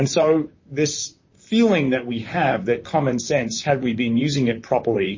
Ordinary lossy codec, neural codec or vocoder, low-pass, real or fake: MP3, 32 kbps; vocoder, 44.1 kHz, 128 mel bands, Pupu-Vocoder; 7.2 kHz; fake